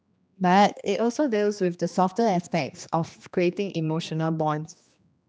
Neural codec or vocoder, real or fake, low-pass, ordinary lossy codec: codec, 16 kHz, 2 kbps, X-Codec, HuBERT features, trained on general audio; fake; none; none